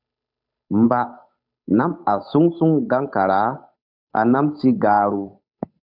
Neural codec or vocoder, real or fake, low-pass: codec, 16 kHz, 8 kbps, FunCodec, trained on Chinese and English, 25 frames a second; fake; 5.4 kHz